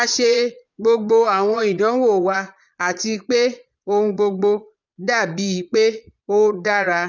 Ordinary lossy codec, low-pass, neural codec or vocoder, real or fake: none; 7.2 kHz; vocoder, 22.05 kHz, 80 mel bands, Vocos; fake